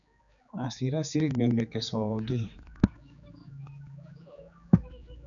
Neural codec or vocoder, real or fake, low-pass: codec, 16 kHz, 4 kbps, X-Codec, HuBERT features, trained on general audio; fake; 7.2 kHz